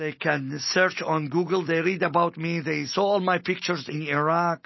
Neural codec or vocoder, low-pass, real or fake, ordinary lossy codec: none; 7.2 kHz; real; MP3, 24 kbps